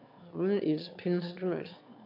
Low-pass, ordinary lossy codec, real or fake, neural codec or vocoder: 5.4 kHz; AAC, 48 kbps; fake; autoencoder, 22.05 kHz, a latent of 192 numbers a frame, VITS, trained on one speaker